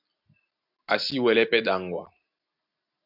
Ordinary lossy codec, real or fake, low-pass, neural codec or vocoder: AAC, 48 kbps; real; 5.4 kHz; none